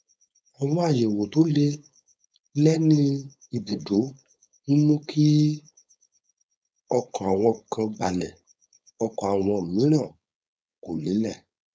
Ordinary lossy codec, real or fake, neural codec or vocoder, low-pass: none; fake; codec, 16 kHz, 4.8 kbps, FACodec; none